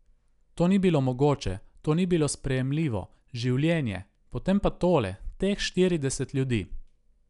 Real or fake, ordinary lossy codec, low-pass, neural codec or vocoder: real; none; 10.8 kHz; none